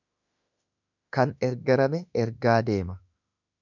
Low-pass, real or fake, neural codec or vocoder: 7.2 kHz; fake; autoencoder, 48 kHz, 32 numbers a frame, DAC-VAE, trained on Japanese speech